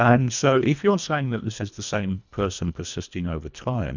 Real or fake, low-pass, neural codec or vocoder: fake; 7.2 kHz; codec, 24 kHz, 1.5 kbps, HILCodec